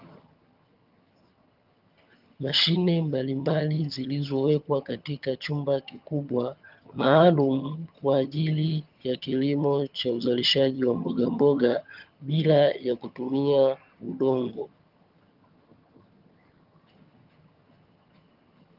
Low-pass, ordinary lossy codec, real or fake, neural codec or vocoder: 5.4 kHz; Opus, 24 kbps; fake; vocoder, 22.05 kHz, 80 mel bands, HiFi-GAN